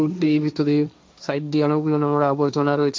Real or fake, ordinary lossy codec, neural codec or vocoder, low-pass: fake; none; codec, 16 kHz, 1.1 kbps, Voila-Tokenizer; none